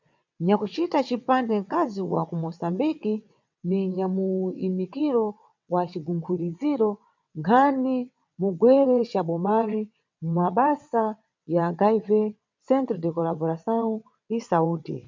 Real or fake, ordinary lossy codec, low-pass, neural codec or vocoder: fake; MP3, 64 kbps; 7.2 kHz; vocoder, 22.05 kHz, 80 mel bands, WaveNeXt